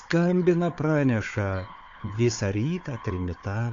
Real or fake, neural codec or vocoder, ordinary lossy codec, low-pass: fake; codec, 16 kHz, 4 kbps, FunCodec, trained on Chinese and English, 50 frames a second; AAC, 48 kbps; 7.2 kHz